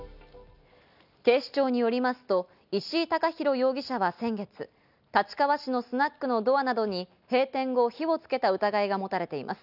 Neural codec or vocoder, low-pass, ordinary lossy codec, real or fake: none; 5.4 kHz; none; real